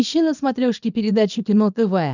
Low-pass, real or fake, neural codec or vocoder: 7.2 kHz; fake; codec, 24 kHz, 0.9 kbps, WavTokenizer, small release